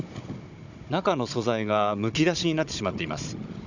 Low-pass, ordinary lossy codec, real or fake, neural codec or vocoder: 7.2 kHz; none; fake; codec, 16 kHz, 16 kbps, FunCodec, trained on Chinese and English, 50 frames a second